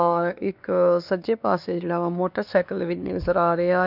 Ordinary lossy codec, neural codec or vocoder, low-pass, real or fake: none; codec, 16 kHz, 2 kbps, X-Codec, WavLM features, trained on Multilingual LibriSpeech; 5.4 kHz; fake